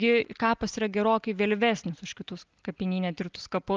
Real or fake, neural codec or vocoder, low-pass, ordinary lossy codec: real; none; 7.2 kHz; Opus, 24 kbps